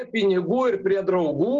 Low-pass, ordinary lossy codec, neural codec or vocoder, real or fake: 7.2 kHz; Opus, 16 kbps; none; real